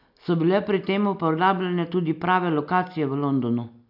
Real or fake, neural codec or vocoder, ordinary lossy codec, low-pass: real; none; none; 5.4 kHz